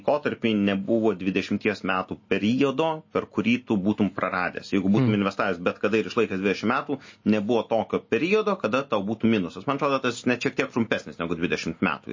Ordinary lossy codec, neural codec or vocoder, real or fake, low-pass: MP3, 32 kbps; none; real; 7.2 kHz